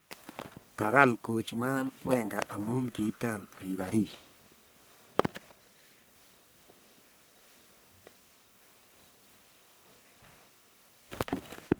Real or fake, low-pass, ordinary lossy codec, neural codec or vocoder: fake; none; none; codec, 44.1 kHz, 1.7 kbps, Pupu-Codec